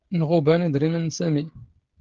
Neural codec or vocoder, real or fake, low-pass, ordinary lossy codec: codec, 16 kHz, 16 kbps, FreqCodec, smaller model; fake; 7.2 kHz; Opus, 16 kbps